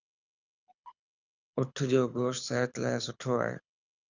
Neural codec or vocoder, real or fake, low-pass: codec, 24 kHz, 6 kbps, HILCodec; fake; 7.2 kHz